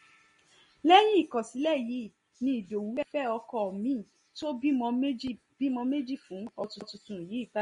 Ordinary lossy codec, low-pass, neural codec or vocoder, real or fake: MP3, 48 kbps; 10.8 kHz; none; real